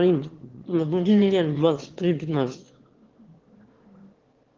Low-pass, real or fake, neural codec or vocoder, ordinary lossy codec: 7.2 kHz; fake; autoencoder, 22.05 kHz, a latent of 192 numbers a frame, VITS, trained on one speaker; Opus, 16 kbps